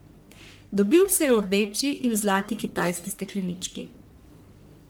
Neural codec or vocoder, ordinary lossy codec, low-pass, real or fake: codec, 44.1 kHz, 1.7 kbps, Pupu-Codec; none; none; fake